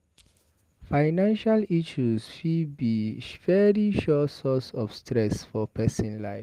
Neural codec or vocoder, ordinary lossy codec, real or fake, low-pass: none; Opus, 24 kbps; real; 14.4 kHz